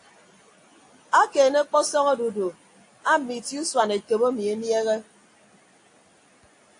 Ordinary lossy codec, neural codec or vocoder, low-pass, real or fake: AAC, 48 kbps; none; 9.9 kHz; real